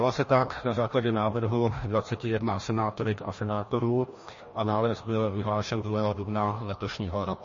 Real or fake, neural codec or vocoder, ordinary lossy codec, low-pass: fake; codec, 16 kHz, 1 kbps, FreqCodec, larger model; MP3, 32 kbps; 7.2 kHz